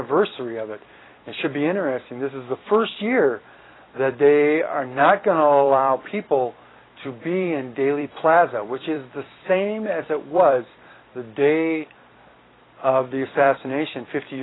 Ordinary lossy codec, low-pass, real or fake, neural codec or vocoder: AAC, 16 kbps; 7.2 kHz; fake; vocoder, 44.1 kHz, 128 mel bands every 256 samples, BigVGAN v2